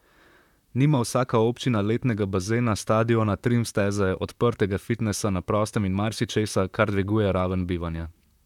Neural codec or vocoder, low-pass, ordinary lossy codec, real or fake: vocoder, 44.1 kHz, 128 mel bands, Pupu-Vocoder; 19.8 kHz; none; fake